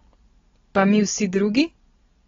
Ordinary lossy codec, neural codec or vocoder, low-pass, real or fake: AAC, 24 kbps; none; 7.2 kHz; real